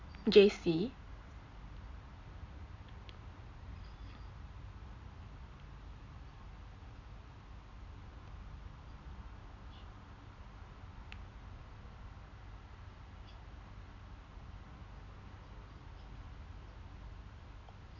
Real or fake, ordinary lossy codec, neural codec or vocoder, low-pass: real; none; none; 7.2 kHz